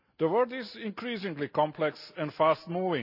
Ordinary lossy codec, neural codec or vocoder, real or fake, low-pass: none; none; real; 5.4 kHz